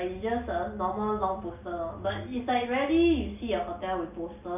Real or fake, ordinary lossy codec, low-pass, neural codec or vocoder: real; none; 3.6 kHz; none